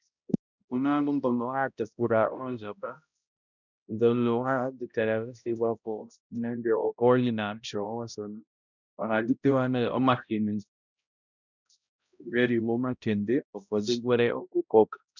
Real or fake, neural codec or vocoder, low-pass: fake; codec, 16 kHz, 0.5 kbps, X-Codec, HuBERT features, trained on balanced general audio; 7.2 kHz